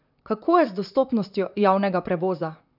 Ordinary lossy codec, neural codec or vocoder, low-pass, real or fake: none; vocoder, 44.1 kHz, 128 mel bands, Pupu-Vocoder; 5.4 kHz; fake